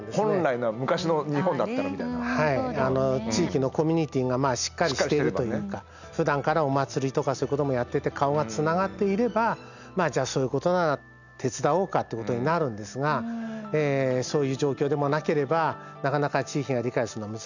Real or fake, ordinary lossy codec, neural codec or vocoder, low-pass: real; none; none; 7.2 kHz